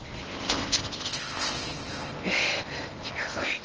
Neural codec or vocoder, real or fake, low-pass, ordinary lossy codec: codec, 16 kHz in and 24 kHz out, 0.6 kbps, FocalCodec, streaming, 4096 codes; fake; 7.2 kHz; Opus, 16 kbps